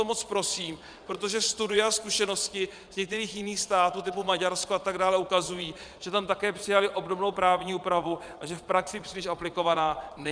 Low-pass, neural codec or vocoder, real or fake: 9.9 kHz; vocoder, 22.05 kHz, 80 mel bands, Vocos; fake